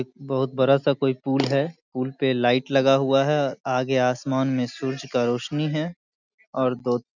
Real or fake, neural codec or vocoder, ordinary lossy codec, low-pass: real; none; none; 7.2 kHz